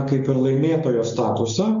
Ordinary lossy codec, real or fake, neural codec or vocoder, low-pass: MP3, 96 kbps; real; none; 7.2 kHz